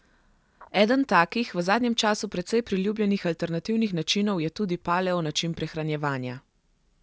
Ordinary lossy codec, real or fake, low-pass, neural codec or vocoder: none; real; none; none